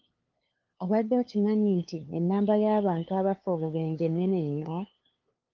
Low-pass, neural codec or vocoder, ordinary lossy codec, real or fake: 7.2 kHz; codec, 16 kHz, 2 kbps, FunCodec, trained on LibriTTS, 25 frames a second; Opus, 32 kbps; fake